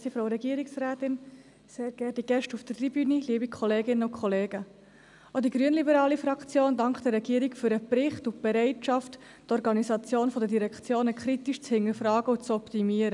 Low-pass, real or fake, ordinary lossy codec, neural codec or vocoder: 10.8 kHz; real; none; none